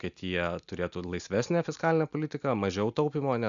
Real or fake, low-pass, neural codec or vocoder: real; 7.2 kHz; none